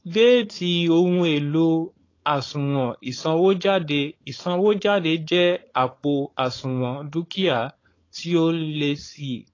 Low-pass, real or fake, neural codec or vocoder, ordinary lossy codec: 7.2 kHz; fake; codec, 16 kHz, 4.8 kbps, FACodec; AAC, 32 kbps